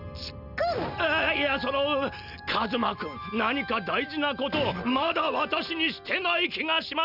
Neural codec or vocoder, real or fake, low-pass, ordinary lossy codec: none; real; 5.4 kHz; none